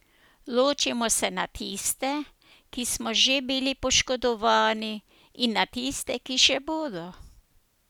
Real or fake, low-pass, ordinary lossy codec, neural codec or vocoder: real; none; none; none